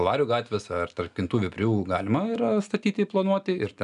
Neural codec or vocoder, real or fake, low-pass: none; real; 10.8 kHz